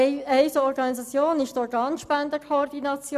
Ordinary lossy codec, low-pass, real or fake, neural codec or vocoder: none; 14.4 kHz; real; none